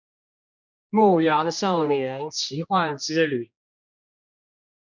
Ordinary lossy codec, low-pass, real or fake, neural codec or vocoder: MP3, 64 kbps; 7.2 kHz; fake; codec, 16 kHz, 1 kbps, X-Codec, HuBERT features, trained on general audio